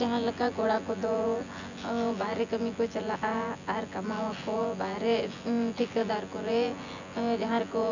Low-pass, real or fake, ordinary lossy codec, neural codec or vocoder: 7.2 kHz; fake; none; vocoder, 24 kHz, 100 mel bands, Vocos